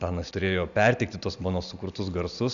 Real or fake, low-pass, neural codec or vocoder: real; 7.2 kHz; none